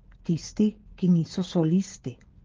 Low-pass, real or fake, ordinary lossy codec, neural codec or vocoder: 7.2 kHz; fake; Opus, 16 kbps; codec, 16 kHz, 16 kbps, FreqCodec, smaller model